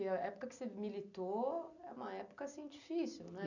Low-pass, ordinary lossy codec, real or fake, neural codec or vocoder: 7.2 kHz; none; real; none